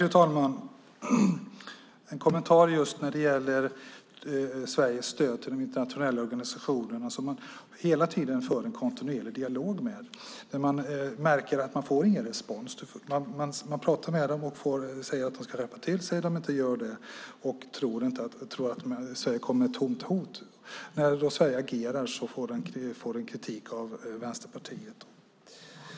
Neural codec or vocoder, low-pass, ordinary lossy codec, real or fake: none; none; none; real